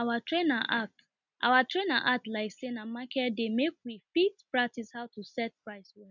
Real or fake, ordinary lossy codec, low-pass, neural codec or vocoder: real; none; 7.2 kHz; none